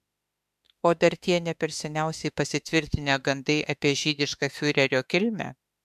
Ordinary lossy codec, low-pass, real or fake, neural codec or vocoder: MP3, 96 kbps; 14.4 kHz; fake; autoencoder, 48 kHz, 32 numbers a frame, DAC-VAE, trained on Japanese speech